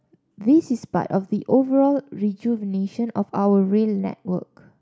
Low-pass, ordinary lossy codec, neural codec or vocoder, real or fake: none; none; none; real